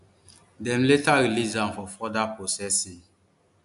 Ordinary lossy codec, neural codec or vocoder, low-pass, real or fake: none; none; 10.8 kHz; real